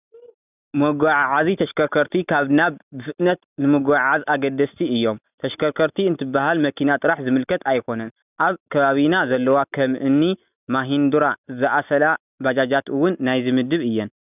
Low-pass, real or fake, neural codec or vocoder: 3.6 kHz; real; none